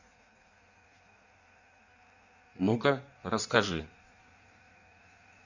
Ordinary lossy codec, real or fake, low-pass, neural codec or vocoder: none; fake; 7.2 kHz; codec, 16 kHz in and 24 kHz out, 1.1 kbps, FireRedTTS-2 codec